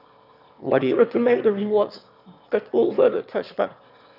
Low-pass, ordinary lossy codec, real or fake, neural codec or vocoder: 5.4 kHz; none; fake; autoencoder, 22.05 kHz, a latent of 192 numbers a frame, VITS, trained on one speaker